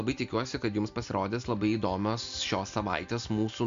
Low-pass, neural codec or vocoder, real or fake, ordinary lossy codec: 7.2 kHz; none; real; MP3, 64 kbps